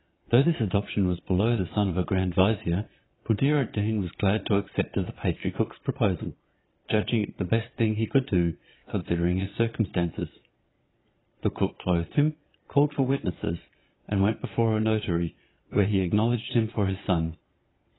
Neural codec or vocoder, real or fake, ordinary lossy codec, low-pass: vocoder, 22.05 kHz, 80 mel bands, Vocos; fake; AAC, 16 kbps; 7.2 kHz